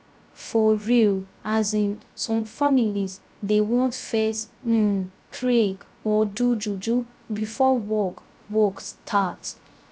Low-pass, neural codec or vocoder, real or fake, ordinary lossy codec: none; codec, 16 kHz, 0.3 kbps, FocalCodec; fake; none